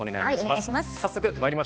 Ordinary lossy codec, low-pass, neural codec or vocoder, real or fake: none; none; codec, 16 kHz, 2 kbps, X-Codec, HuBERT features, trained on balanced general audio; fake